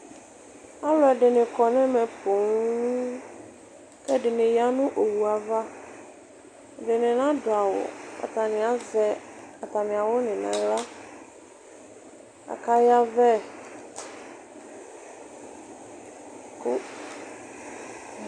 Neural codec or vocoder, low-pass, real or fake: none; 9.9 kHz; real